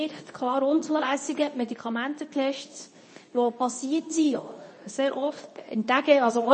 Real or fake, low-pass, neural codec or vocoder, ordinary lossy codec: fake; 10.8 kHz; codec, 24 kHz, 0.9 kbps, WavTokenizer, medium speech release version 2; MP3, 32 kbps